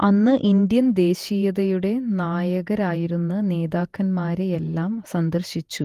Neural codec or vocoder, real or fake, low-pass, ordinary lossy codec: vocoder, 48 kHz, 128 mel bands, Vocos; fake; 14.4 kHz; Opus, 24 kbps